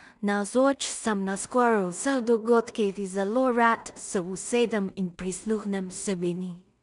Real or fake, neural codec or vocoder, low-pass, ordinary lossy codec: fake; codec, 16 kHz in and 24 kHz out, 0.4 kbps, LongCat-Audio-Codec, two codebook decoder; 10.8 kHz; none